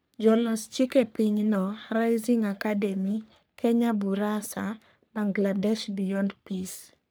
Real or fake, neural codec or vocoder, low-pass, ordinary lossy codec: fake; codec, 44.1 kHz, 3.4 kbps, Pupu-Codec; none; none